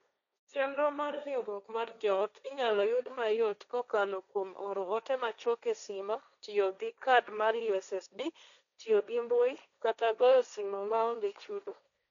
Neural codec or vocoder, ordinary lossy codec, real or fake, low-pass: codec, 16 kHz, 1.1 kbps, Voila-Tokenizer; none; fake; 7.2 kHz